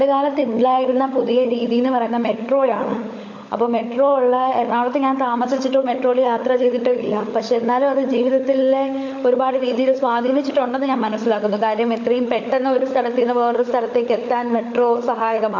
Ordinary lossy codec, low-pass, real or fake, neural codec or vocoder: AAC, 48 kbps; 7.2 kHz; fake; codec, 16 kHz, 16 kbps, FunCodec, trained on LibriTTS, 50 frames a second